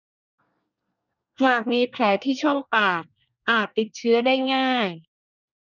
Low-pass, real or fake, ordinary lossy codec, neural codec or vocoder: 7.2 kHz; fake; none; codec, 24 kHz, 1 kbps, SNAC